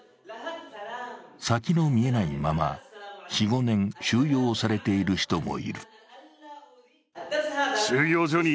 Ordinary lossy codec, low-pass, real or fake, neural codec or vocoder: none; none; real; none